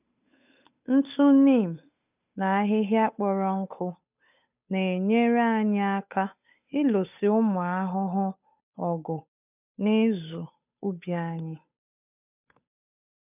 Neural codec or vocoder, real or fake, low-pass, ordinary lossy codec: codec, 16 kHz, 8 kbps, FunCodec, trained on Chinese and English, 25 frames a second; fake; 3.6 kHz; none